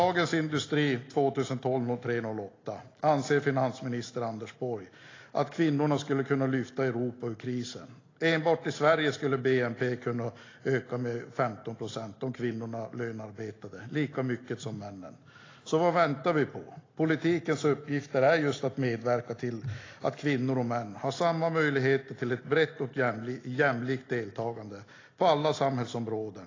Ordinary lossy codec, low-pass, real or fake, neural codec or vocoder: AAC, 32 kbps; 7.2 kHz; real; none